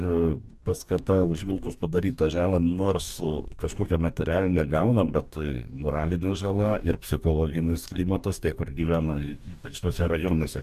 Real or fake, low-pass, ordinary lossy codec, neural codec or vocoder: fake; 14.4 kHz; AAC, 96 kbps; codec, 44.1 kHz, 2.6 kbps, DAC